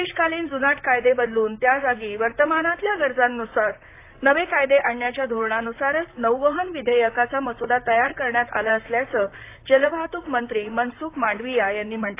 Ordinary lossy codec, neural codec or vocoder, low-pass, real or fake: AAC, 24 kbps; vocoder, 44.1 kHz, 128 mel bands, Pupu-Vocoder; 3.6 kHz; fake